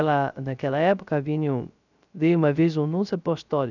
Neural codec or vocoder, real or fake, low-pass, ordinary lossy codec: codec, 16 kHz, 0.3 kbps, FocalCodec; fake; 7.2 kHz; none